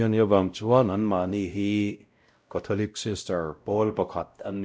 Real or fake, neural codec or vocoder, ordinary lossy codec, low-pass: fake; codec, 16 kHz, 0.5 kbps, X-Codec, WavLM features, trained on Multilingual LibriSpeech; none; none